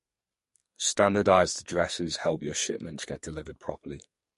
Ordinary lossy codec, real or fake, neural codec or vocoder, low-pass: MP3, 48 kbps; fake; codec, 44.1 kHz, 2.6 kbps, SNAC; 14.4 kHz